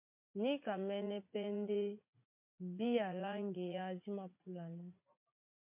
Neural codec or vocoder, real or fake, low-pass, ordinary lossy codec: vocoder, 44.1 kHz, 80 mel bands, Vocos; fake; 3.6 kHz; MP3, 32 kbps